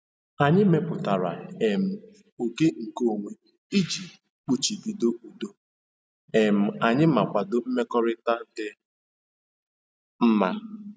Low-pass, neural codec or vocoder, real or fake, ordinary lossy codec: none; none; real; none